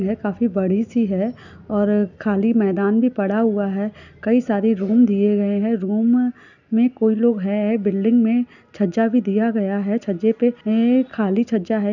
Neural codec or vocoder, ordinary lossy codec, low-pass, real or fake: none; none; 7.2 kHz; real